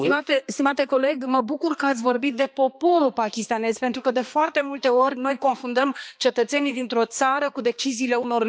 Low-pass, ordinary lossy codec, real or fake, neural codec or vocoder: none; none; fake; codec, 16 kHz, 2 kbps, X-Codec, HuBERT features, trained on balanced general audio